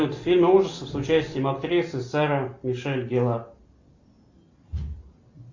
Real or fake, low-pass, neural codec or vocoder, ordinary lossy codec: real; 7.2 kHz; none; Opus, 64 kbps